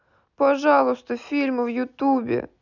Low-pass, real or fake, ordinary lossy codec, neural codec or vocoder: 7.2 kHz; real; none; none